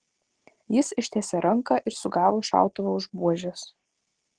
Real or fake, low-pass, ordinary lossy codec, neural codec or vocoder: real; 9.9 kHz; Opus, 16 kbps; none